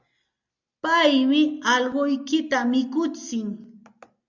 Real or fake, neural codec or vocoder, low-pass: real; none; 7.2 kHz